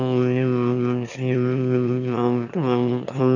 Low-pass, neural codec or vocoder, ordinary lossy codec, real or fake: 7.2 kHz; autoencoder, 22.05 kHz, a latent of 192 numbers a frame, VITS, trained on one speaker; none; fake